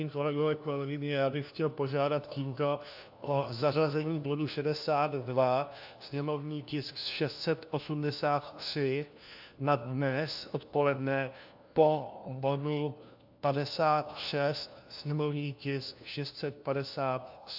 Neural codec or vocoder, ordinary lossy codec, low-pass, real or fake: codec, 16 kHz, 1 kbps, FunCodec, trained on LibriTTS, 50 frames a second; AAC, 48 kbps; 5.4 kHz; fake